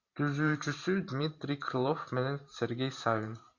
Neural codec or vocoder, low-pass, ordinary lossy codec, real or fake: vocoder, 44.1 kHz, 128 mel bands every 512 samples, BigVGAN v2; 7.2 kHz; Opus, 64 kbps; fake